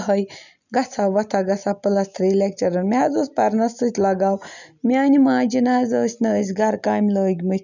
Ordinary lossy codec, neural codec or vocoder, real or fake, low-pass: none; none; real; 7.2 kHz